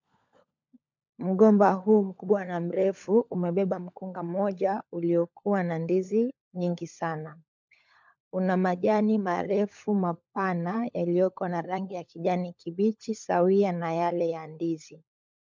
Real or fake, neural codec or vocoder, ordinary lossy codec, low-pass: fake; codec, 16 kHz, 16 kbps, FunCodec, trained on LibriTTS, 50 frames a second; MP3, 64 kbps; 7.2 kHz